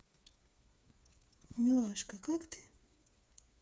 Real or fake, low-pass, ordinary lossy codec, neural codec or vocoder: fake; none; none; codec, 16 kHz, 4 kbps, FreqCodec, smaller model